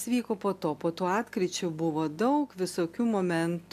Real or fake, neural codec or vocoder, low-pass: real; none; 14.4 kHz